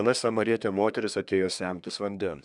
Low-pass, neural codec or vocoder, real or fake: 10.8 kHz; codec, 24 kHz, 1 kbps, SNAC; fake